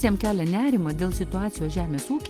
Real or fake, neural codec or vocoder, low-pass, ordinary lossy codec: real; none; 14.4 kHz; Opus, 24 kbps